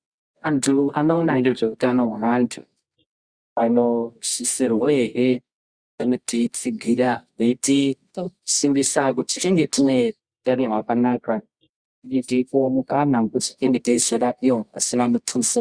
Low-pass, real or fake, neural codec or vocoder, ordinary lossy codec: 9.9 kHz; fake; codec, 24 kHz, 0.9 kbps, WavTokenizer, medium music audio release; Opus, 64 kbps